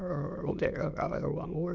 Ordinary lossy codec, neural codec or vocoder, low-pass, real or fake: none; autoencoder, 22.05 kHz, a latent of 192 numbers a frame, VITS, trained on many speakers; 7.2 kHz; fake